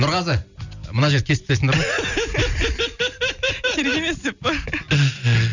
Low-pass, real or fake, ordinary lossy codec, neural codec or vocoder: 7.2 kHz; real; none; none